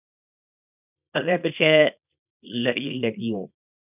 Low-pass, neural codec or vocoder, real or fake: 3.6 kHz; codec, 24 kHz, 0.9 kbps, WavTokenizer, small release; fake